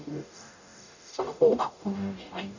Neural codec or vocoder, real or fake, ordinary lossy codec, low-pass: codec, 44.1 kHz, 0.9 kbps, DAC; fake; none; 7.2 kHz